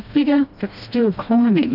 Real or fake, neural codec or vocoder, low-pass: fake; codec, 16 kHz, 1 kbps, FreqCodec, smaller model; 5.4 kHz